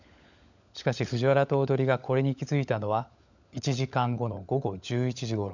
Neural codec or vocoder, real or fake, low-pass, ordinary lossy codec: codec, 16 kHz, 16 kbps, FunCodec, trained on LibriTTS, 50 frames a second; fake; 7.2 kHz; none